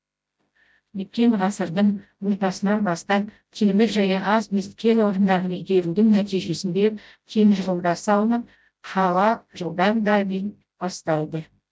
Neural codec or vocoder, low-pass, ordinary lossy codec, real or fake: codec, 16 kHz, 0.5 kbps, FreqCodec, smaller model; none; none; fake